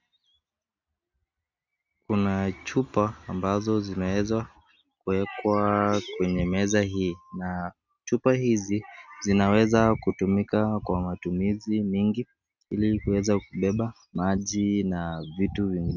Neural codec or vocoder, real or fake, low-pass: none; real; 7.2 kHz